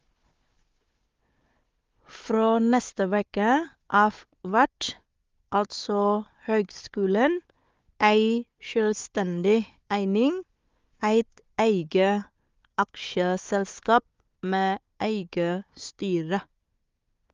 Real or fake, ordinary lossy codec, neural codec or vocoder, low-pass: fake; Opus, 32 kbps; codec, 16 kHz, 4 kbps, FunCodec, trained on Chinese and English, 50 frames a second; 7.2 kHz